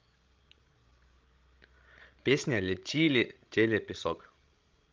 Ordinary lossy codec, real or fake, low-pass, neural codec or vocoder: Opus, 24 kbps; fake; 7.2 kHz; codec, 16 kHz, 16 kbps, FreqCodec, larger model